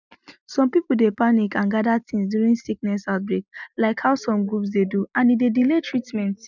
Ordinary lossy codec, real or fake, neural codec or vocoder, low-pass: none; real; none; 7.2 kHz